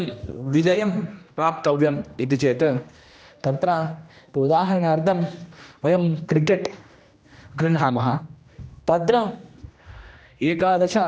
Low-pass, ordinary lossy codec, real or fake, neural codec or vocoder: none; none; fake; codec, 16 kHz, 1 kbps, X-Codec, HuBERT features, trained on general audio